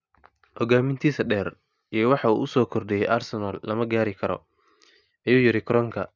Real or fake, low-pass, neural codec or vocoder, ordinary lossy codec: real; 7.2 kHz; none; none